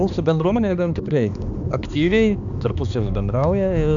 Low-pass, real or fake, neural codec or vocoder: 7.2 kHz; fake; codec, 16 kHz, 2 kbps, X-Codec, HuBERT features, trained on balanced general audio